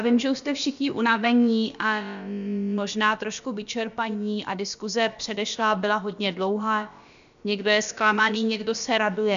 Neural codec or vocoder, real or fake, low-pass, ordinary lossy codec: codec, 16 kHz, about 1 kbps, DyCAST, with the encoder's durations; fake; 7.2 kHz; AAC, 96 kbps